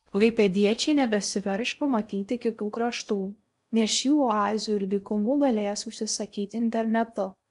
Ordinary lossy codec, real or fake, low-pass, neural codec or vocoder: AAC, 96 kbps; fake; 10.8 kHz; codec, 16 kHz in and 24 kHz out, 0.6 kbps, FocalCodec, streaming, 4096 codes